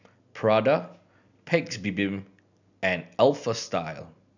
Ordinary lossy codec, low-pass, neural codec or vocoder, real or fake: none; 7.2 kHz; none; real